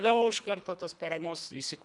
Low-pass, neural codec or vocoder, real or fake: 10.8 kHz; codec, 24 kHz, 1.5 kbps, HILCodec; fake